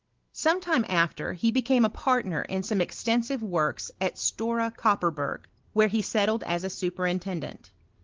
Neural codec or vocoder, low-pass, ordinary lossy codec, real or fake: none; 7.2 kHz; Opus, 16 kbps; real